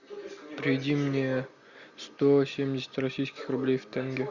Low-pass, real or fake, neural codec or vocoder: 7.2 kHz; real; none